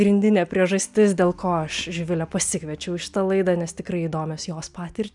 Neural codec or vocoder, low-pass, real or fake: none; 10.8 kHz; real